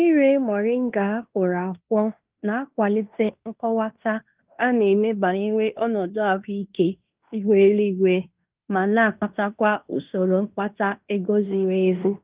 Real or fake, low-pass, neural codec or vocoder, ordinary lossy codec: fake; 3.6 kHz; codec, 16 kHz in and 24 kHz out, 0.9 kbps, LongCat-Audio-Codec, fine tuned four codebook decoder; Opus, 32 kbps